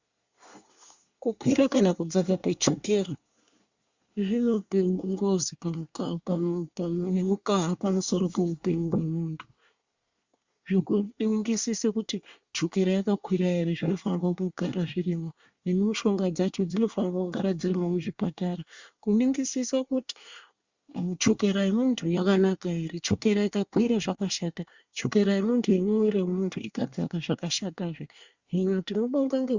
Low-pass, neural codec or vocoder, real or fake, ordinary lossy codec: 7.2 kHz; codec, 24 kHz, 1 kbps, SNAC; fake; Opus, 64 kbps